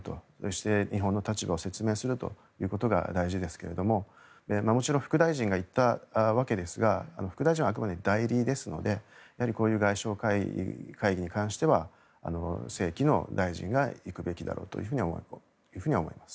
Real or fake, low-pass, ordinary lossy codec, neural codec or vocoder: real; none; none; none